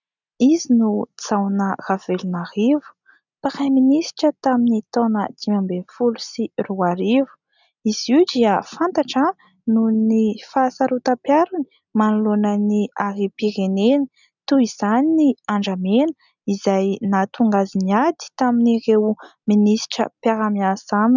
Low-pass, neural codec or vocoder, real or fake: 7.2 kHz; none; real